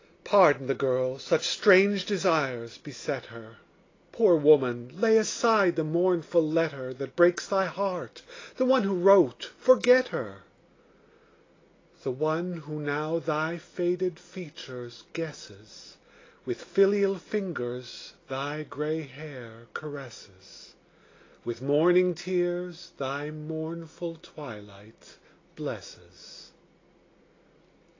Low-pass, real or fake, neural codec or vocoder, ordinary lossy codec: 7.2 kHz; real; none; AAC, 32 kbps